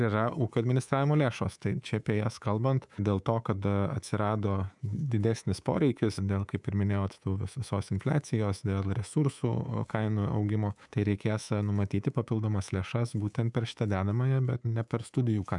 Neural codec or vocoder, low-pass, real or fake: codec, 24 kHz, 3.1 kbps, DualCodec; 10.8 kHz; fake